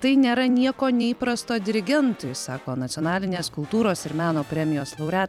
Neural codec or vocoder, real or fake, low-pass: vocoder, 44.1 kHz, 128 mel bands every 256 samples, BigVGAN v2; fake; 19.8 kHz